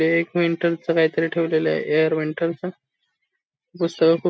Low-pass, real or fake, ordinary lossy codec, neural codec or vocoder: none; real; none; none